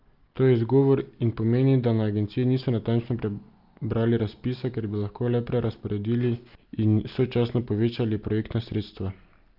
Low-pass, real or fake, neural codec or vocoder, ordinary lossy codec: 5.4 kHz; real; none; Opus, 32 kbps